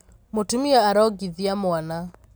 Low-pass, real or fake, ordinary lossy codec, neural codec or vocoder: none; real; none; none